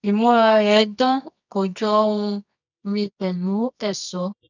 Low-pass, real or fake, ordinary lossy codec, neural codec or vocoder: 7.2 kHz; fake; none; codec, 24 kHz, 0.9 kbps, WavTokenizer, medium music audio release